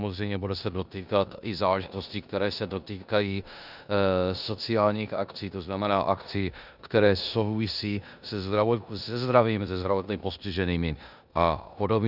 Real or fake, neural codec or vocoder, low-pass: fake; codec, 16 kHz in and 24 kHz out, 0.9 kbps, LongCat-Audio-Codec, four codebook decoder; 5.4 kHz